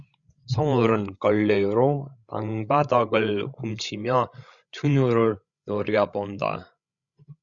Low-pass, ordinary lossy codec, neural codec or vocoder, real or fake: 7.2 kHz; Opus, 64 kbps; codec, 16 kHz, 16 kbps, FreqCodec, larger model; fake